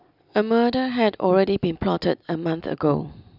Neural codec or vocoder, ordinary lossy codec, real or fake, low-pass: none; none; real; 5.4 kHz